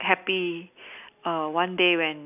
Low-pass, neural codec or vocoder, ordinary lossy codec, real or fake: 3.6 kHz; none; none; real